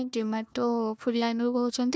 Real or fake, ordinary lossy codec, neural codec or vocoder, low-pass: fake; none; codec, 16 kHz, 1 kbps, FunCodec, trained on Chinese and English, 50 frames a second; none